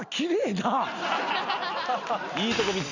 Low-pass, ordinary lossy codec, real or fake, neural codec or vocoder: 7.2 kHz; none; real; none